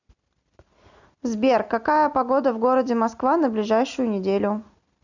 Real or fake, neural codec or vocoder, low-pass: real; none; 7.2 kHz